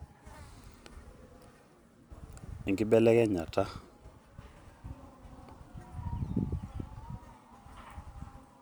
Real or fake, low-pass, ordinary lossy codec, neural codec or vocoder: real; none; none; none